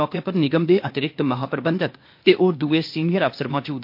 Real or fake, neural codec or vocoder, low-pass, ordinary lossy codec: fake; codec, 16 kHz, 0.8 kbps, ZipCodec; 5.4 kHz; MP3, 32 kbps